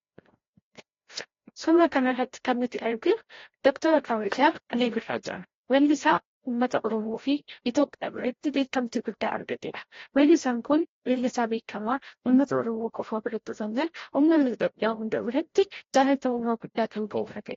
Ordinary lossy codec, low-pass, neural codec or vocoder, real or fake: AAC, 32 kbps; 7.2 kHz; codec, 16 kHz, 0.5 kbps, FreqCodec, larger model; fake